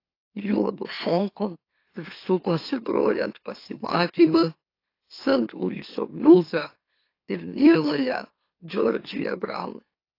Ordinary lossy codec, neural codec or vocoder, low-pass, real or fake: AAC, 32 kbps; autoencoder, 44.1 kHz, a latent of 192 numbers a frame, MeloTTS; 5.4 kHz; fake